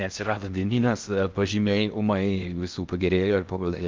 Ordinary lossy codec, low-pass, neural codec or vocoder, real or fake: Opus, 32 kbps; 7.2 kHz; codec, 16 kHz in and 24 kHz out, 0.6 kbps, FocalCodec, streaming, 4096 codes; fake